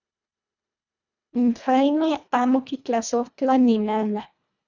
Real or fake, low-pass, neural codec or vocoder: fake; 7.2 kHz; codec, 24 kHz, 1.5 kbps, HILCodec